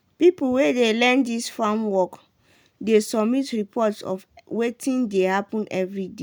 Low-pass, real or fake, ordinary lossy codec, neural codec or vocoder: none; real; none; none